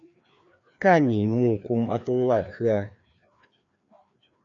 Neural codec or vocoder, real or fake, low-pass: codec, 16 kHz, 2 kbps, FreqCodec, larger model; fake; 7.2 kHz